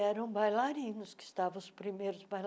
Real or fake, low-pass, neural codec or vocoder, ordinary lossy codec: real; none; none; none